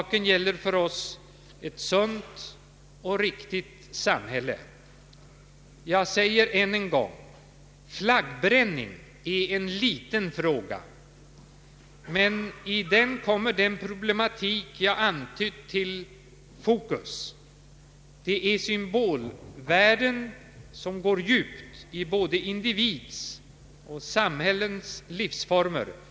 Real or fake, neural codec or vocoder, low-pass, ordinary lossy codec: real; none; none; none